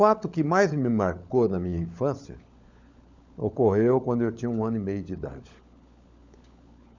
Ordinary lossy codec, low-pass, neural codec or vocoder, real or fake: Opus, 64 kbps; 7.2 kHz; codec, 16 kHz, 16 kbps, FunCodec, trained on LibriTTS, 50 frames a second; fake